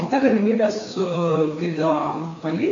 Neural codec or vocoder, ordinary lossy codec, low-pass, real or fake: codec, 16 kHz, 2 kbps, FreqCodec, larger model; AAC, 48 kbps; 7.2 kHz; fake